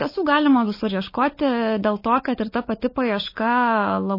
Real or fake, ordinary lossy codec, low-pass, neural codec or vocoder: fake; MP3, 24 kbps; 5.4 kHz; codec, 16 kHz, 16 kbps, FunCodec, trained on LibriTTS, 50 frames a second